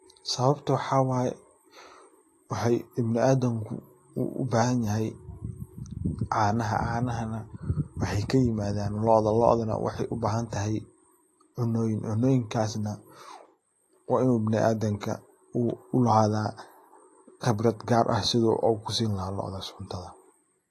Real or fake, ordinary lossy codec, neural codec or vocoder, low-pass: real; AAC, 48 kbps; none; 14.4 kHz